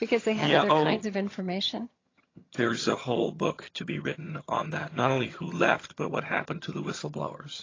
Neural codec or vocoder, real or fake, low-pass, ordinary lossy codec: vocoder, 22.05 kHz, 80 mel bands, HiFi-GAN; fake; 7.2 kHz; AAC, 32 kbps